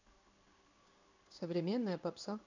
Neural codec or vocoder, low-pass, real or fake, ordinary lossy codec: codec, 16 kHz in and 24 kHz out, 1 kbps, XY-Tokenizer; 7.2 kHz; fake; none